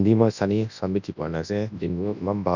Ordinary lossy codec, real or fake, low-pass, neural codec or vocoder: none; fake; 7.2 kHz; codec, 24 kHz, 0.9 kbps, WavTokenizer, large speech release